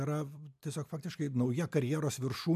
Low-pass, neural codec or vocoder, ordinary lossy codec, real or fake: 14.4 kHz; none; MP3, 96 kbps; real